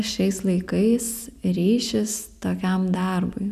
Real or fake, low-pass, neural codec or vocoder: real; 14.4 kHz; none